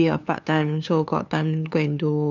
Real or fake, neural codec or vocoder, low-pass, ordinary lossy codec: fake; codec, 16 kHz, 2 kbps, FunCodec, trained on LibriTTS, 25 frames a second; 7.2 kHz; none